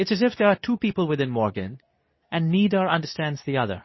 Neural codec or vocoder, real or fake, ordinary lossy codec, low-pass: codec, 24 kHz, 0.9 kbps, WavTokenizer, medium speech release version 2; fake; MP3, 24 kbps; 7.2 kHz